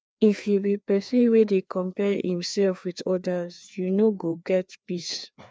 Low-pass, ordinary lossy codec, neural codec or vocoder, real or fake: none; none; codec, 16 kHz, 2 kbps, FreqCodec, larger model; fake